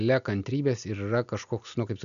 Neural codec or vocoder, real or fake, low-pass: none; real; 7.2 kHz